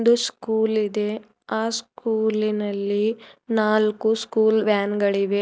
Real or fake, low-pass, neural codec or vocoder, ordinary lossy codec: real; none; none; none